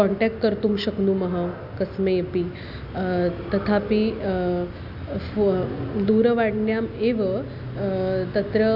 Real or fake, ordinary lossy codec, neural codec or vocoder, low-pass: real; none; none; 5.4 kHz